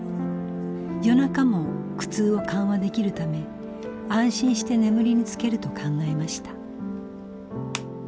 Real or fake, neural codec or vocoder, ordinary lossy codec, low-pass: real; none; none; none